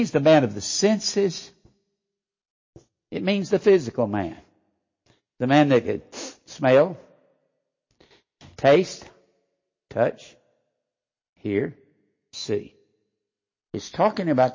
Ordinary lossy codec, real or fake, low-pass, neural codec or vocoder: MP3, 32 kbps; real; 7.2 kHz; none